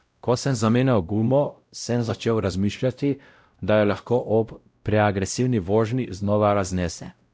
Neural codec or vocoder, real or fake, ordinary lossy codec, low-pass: codec, 16 kHz, 0.5 kbps, X-Codec, WavLM features, trained on Multilingual LibriSpeech; fake; none; none